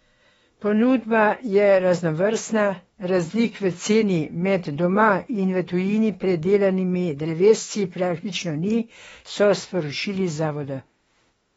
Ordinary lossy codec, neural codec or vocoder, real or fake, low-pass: AAC, 24 kbps; autoencoder, 48 kHz, 32 numbers a frame, DAC-VAE, trained on Japanese speech; fake; 19.8 kHz